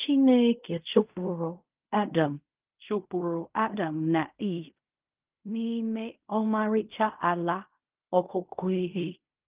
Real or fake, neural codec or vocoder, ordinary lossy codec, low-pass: fake; codec, 16 kHz in and 24 kHz out, 0.4 kbps, LongCat-Audio-Codec, fine tuned four codebook decoder; Opus, 24 kbps; 3.6 kHz